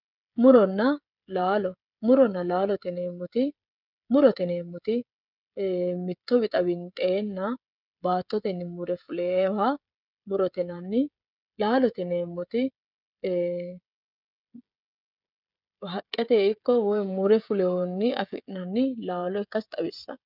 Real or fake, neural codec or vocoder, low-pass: fake; codec, 16 kHz, 16 kbps, FreqCodec, smaller model; 5.4 kHz